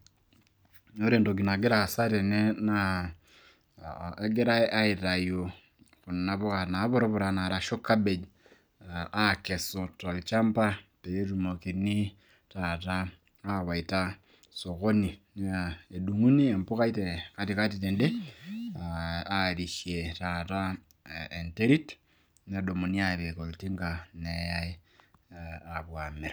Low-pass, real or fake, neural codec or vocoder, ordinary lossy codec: none; real; none; none